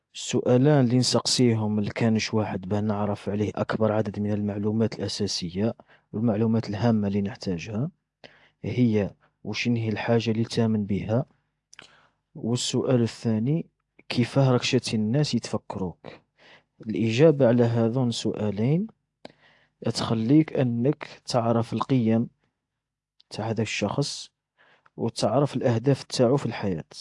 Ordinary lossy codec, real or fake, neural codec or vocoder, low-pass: AAC, 64 kbps; real; none; 10.8 kHz